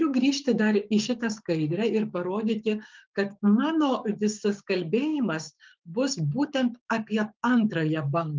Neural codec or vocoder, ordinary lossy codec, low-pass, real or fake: codec, 16 kHz, 6 kbps, DAC; Opus, 24 kbps; 7.2 kHz; fake